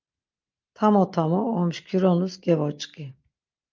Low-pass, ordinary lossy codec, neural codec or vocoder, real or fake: 7.2 kHz; Opus, 24 kbps; none; real